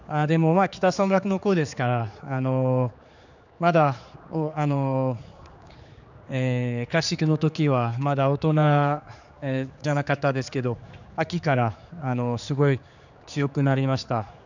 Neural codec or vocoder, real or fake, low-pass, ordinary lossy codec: codec, 16 kHz, 4 kbps, X-Codec, HuBERT features, trained on general audio; fake; 7.2 kHz; none